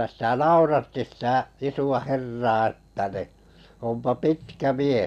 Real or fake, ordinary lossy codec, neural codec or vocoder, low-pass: real; none; none; 14.4 kHz